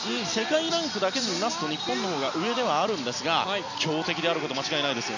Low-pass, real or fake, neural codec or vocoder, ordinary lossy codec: 7.2 kHz; real; none; none